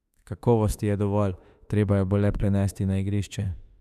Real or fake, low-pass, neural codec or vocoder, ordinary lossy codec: fake; 14.4 kHz; autoencoder, 48 kHz, 32 numbers a frame, DAC-VAE, trained on Japanese speech; none